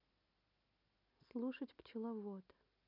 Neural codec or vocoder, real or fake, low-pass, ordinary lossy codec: none; real; 5.4 kHz; none